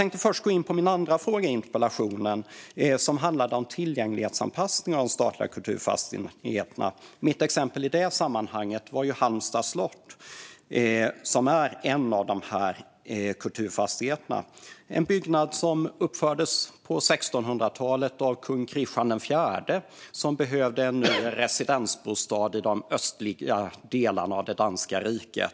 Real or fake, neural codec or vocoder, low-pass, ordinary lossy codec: real; none; none; none